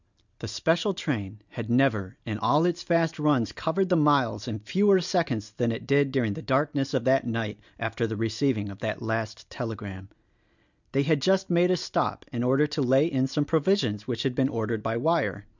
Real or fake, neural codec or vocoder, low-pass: real; none; 7.2 kHz